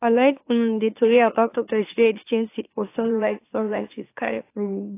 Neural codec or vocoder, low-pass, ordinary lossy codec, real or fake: autoencoder, 44.1 kHz, a latent of 192 numbers a frame, MeloTTS; 3.6 kHz; AAC, 24 kbps; fake